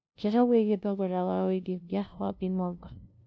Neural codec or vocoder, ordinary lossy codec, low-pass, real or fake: codec, 16 kHz, 0.5 kbps, FunCodec, trained on LibriTTS, 25 frames a second; none; none; fake